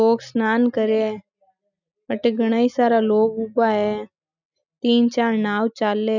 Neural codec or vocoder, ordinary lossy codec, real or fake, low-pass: none; none; real; 7.2 kHz